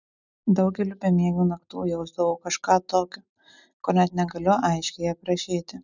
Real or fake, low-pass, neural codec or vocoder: real; 7.2 kHz; none